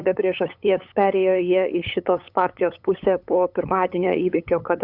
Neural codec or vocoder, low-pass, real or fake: codec, 16 kHz, 8 kbps, FunCodec, trained on LibriTTS, 25 frames a second; 5.4 kHz; fake